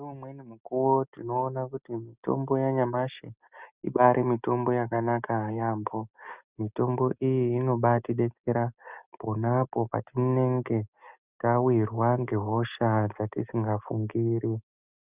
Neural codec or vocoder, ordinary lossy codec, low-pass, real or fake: none; Opus, 64 kbps; 3.6 kHz; real